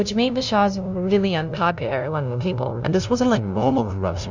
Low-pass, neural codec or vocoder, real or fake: 7.2 kHz; codec, 16 kHz, 0.5 kbps, FunCodec, trained on LibriTTS, 25 frames a second; fake